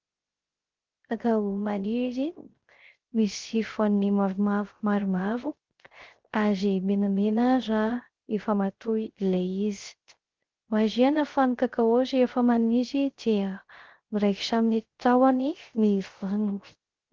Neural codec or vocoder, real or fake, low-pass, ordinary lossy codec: codec, 16 kHz, 0.3 kbps, FocalCodec; fake; 7.2 kHz; Opus, 16 kbps